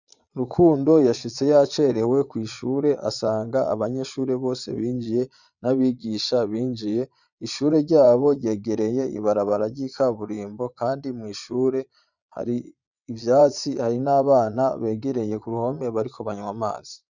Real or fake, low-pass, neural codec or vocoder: fake; 7.2 kHz; vocoder, 22.05 kHz, 80 mel bands, Vocos